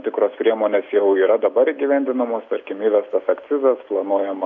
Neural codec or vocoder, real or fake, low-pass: none; real; 7.2 kHz